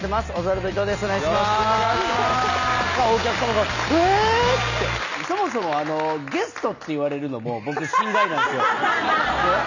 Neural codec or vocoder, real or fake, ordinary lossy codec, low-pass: none; real; none; 7.2 kHz